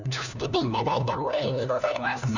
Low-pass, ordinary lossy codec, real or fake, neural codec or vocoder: 7.2 kHz; none; fake; codec, 16 kHz, 1 kbps, FunCodec, trained on LibriTTS, 50 frames a second